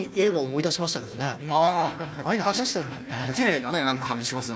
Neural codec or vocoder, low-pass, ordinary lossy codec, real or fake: codec, 16 kHz, 1 kbps, FunCodec, trained on Chinese and English, 50 frames a second; none; none; fake